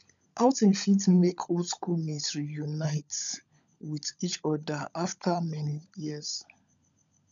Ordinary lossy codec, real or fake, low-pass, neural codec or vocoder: none; fake; 7.2 kHz; codec, 16 kHz, 16 kbps, FunCodec, trained on LibriTTS, 50 frames a second